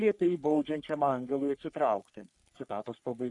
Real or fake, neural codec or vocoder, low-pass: fake; codec, 44.1 kHz, 3.4 kbps, Pupu-Codec; 10.8 kHz